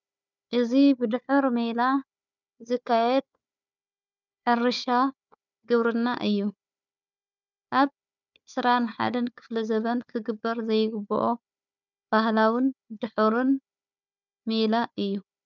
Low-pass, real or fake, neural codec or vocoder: 7.2 kHz; fake; codec, 16 kHz, 4 kbps, FunCodec, trained on Chinese and English, 50 frames a second